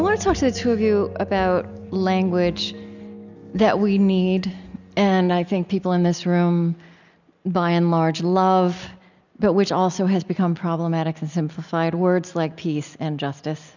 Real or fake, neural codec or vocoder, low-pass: real; none; 7.2 kHz